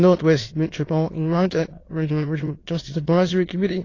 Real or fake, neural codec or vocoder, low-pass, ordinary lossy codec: fake; autoencoder, 22.05 kHz, a latent of 192 numbers a frame, VITS, trained on many speakers; 7.2 kHz; AAC, 32 kbps